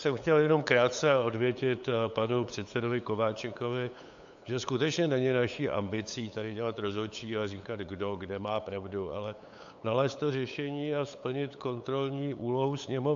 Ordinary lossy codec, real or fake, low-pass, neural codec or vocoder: AAC, 64 kbps; fake; 7.2 kHz; codec, 16 kHz, 8 kbps, FunCodec, trained on LibriTTS, 25 frames a second